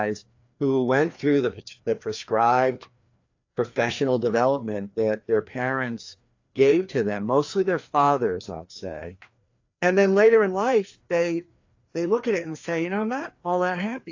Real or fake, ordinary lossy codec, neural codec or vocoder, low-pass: fake; AAC, 48 kbps; codec, 16 kHz, 2 kbps, FreqCodec, larger model; 7.2 kHz